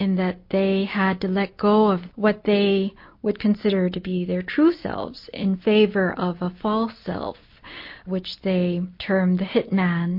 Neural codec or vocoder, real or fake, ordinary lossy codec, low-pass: none; real; MP3, 32 kbps; 5.4 kHz